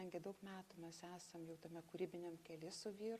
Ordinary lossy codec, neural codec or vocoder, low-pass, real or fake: Opus, 64 kbps; none; 14.4 kHz; real